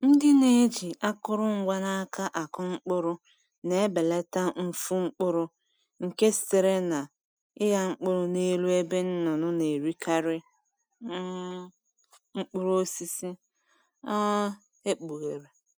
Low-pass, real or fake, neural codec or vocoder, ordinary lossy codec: none; real; none; none